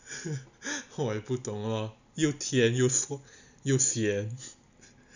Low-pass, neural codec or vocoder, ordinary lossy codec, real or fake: 7.2 kHz; none; none; real